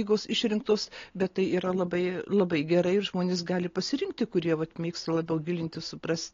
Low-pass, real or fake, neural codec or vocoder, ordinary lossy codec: 7.2 kHz; real; none; AAC, 32 kbps